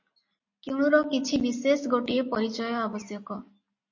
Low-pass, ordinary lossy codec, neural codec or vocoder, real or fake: 7.2 kHz; MP3, 32 kbps; none; real